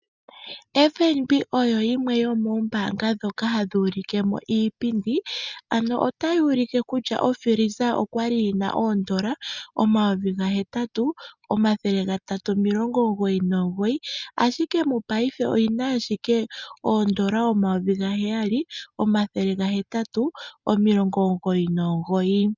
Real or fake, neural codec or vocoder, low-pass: real; none; 7.2 kHz